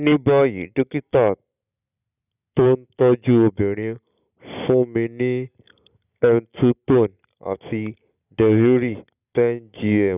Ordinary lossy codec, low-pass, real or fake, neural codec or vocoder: none; 3.6 kHz; real; none